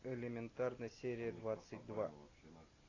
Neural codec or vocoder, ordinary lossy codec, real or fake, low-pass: none; AAC, 32 kbps; real; 7.2 kHz